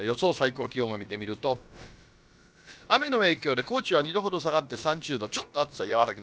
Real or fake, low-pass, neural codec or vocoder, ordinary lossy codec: fake; none; codec, 16 kHz, about 1 kbps, DyCAST, with the encoder's durations; none